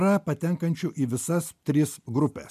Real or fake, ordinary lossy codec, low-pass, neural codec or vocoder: real; MP3, 96 kbps; 14.4 kHz; none